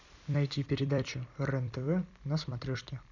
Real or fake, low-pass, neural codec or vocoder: fake; 7.2 kHz; vocoder, 44.1 kHz, 128 mel bands every 256 samples, BigVGAN v2